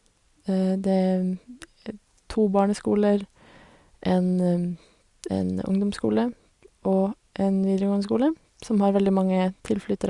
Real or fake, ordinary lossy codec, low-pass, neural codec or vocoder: real; Opus, 64 kbps; 10.8 kHz; none